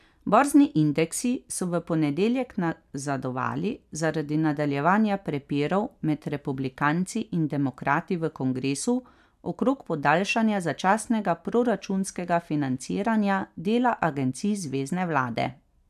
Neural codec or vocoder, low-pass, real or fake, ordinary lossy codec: none; 14.4 kHz; real; none